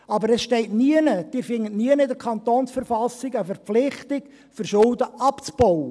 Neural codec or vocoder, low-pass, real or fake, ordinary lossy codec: none; none; real; none